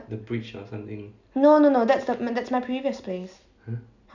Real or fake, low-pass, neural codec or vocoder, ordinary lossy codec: real; 7.2 kHz; none; none